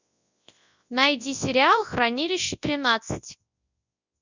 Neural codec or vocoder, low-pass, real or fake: codec, 24 kHz, 0.9 kbps, WavTokenizer, large speech release; 7.2 kHz; fake